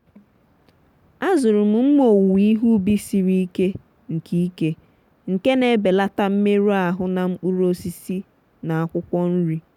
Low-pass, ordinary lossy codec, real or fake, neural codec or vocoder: 19.8 kHz; none; real; none